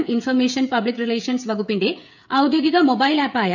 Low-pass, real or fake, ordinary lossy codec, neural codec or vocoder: 7.2 kHz; fake; none; codec, 16 kHz, 16 kbps, FreqCodec, smaller model